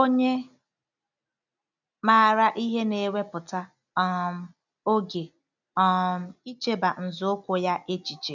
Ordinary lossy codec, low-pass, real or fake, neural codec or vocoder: none; 7.2 kHz; real; none